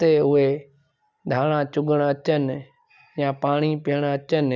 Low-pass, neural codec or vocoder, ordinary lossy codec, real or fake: 7.2 kHz; none; none; real